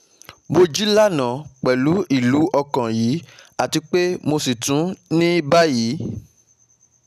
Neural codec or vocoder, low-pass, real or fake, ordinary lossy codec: none; 14.4 kHz; real; none